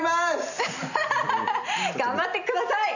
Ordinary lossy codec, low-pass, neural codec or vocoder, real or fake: none; 7.2 kHz; none; real